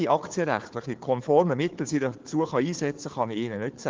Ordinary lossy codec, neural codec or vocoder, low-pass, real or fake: Opus, 16 kbps; codec, 16 kHz, 4 kbps, FunCodec, trained on Chinese and English, 50 frames a second; 7.2 kHz; fake